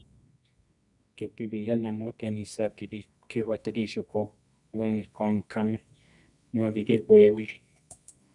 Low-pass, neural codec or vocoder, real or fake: 10.8 kHz; codec, 24 kHz, 0.9 kbps, WavTokenizer, medium music audio release; fake